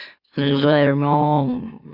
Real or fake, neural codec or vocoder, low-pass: fake; autoencoder, 44.1 kHz, a latent of 192 numbers a frame, MeloTTS; 5.4 kHz